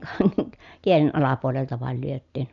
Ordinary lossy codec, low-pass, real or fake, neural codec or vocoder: MP3, 96 kbps; 7.2 kHz; real; none